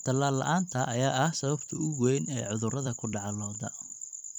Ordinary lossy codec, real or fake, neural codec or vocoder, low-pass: none; fake; vocoder, 44.1 kHz, 128 mel bands every 256 samples, BigVGAN v2; 19.8 kHz